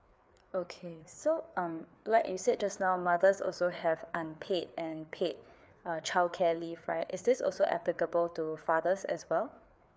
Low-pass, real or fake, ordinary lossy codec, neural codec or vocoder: none; fake; none; codec, 16 kHz, 4 kbps, FreqCodec, larger model